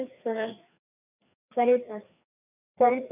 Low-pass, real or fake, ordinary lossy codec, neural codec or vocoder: 3.6 kHz; fake; none; codec, 16 kHz, 2 kbps, FreqCodec, larger model